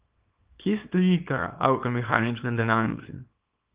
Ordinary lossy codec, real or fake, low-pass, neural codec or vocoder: Opus, 32 kbps; fake; 3.6 kHz; codec, 24 kHz, 0.9 kbps, WavTokenizer, small release